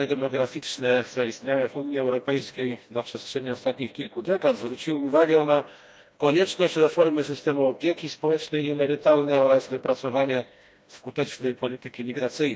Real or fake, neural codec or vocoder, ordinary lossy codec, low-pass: fake; codec, 16 kHz, 1 kbps, FreqCodec, smaller model; none; none